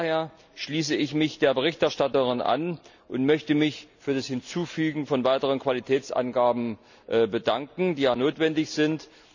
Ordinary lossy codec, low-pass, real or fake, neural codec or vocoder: none; 7.2 kHz; real; none